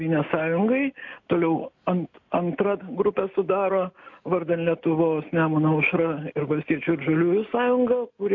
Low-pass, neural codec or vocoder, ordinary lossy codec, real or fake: 7.2 kHz; none; AAC, 48 kbps; real